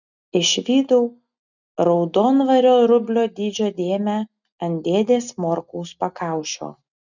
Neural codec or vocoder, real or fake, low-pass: none; real; 7.2 kHz